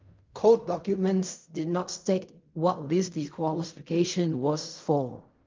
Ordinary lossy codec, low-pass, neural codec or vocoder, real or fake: Opus, 24 kbps; 7.2 kHz; codec, 16 kHz in and 24 kHz out, 0.4 kbps, LongCat-Audio-Codec, fine tuned four codebook decoder; fake